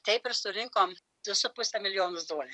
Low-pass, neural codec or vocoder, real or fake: 10.8 kHz; vocoder, 44.1 kHz, 128 mel bands every 256 samples, BigVGAN v2; fake